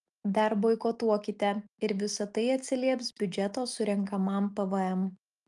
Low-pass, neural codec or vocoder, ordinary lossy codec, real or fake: 10.8 kHz; none; Opus, 32 kbps; real